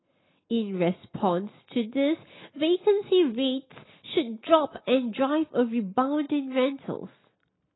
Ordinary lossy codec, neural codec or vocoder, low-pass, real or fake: AAC, 16 kbps; none; 7.2 kHz; real